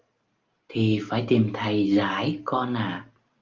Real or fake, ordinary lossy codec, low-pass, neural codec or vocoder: real; Opus, 24 kbps; 7.2 kHz; none